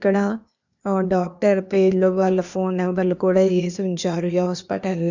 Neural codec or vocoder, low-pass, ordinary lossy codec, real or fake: codec, 16 kHz, 0.8 kbps, ZipCodec; 7.2 kHz; none; fake